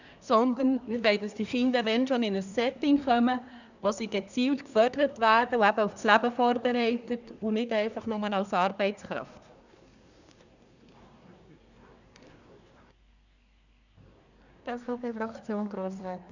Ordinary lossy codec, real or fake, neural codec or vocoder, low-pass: none; fake; codec, 24 kHz, 1 kbps, SNAC; 7.2 kHz